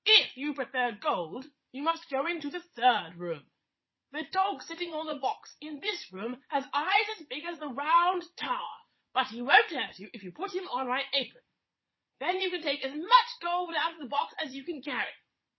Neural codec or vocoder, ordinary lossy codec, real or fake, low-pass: vocoder, 22.05 kHz, 80 mel bands, Vocos; MP3, 24 kbps; fake; 7.2 kHz